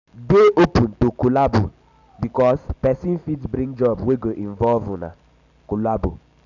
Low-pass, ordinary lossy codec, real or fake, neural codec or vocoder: 7.2 kHz; none; real; none